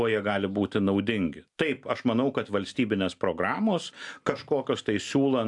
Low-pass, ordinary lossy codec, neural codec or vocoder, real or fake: 10.8 kHz; AAC, 64 kbps; none; real